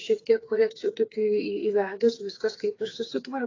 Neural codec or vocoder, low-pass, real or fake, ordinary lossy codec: codec, 16 kHz, 4 kbps, FreqCodec, smaller model; 7.2 kHz; fake; AAC, 32 kbps